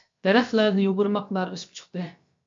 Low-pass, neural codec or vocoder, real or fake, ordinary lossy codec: 7.2 kHz; codec, 16 kHz, about 1 kbps, DyCAST, with the encoder's durations; fake; MP3, 64 kbps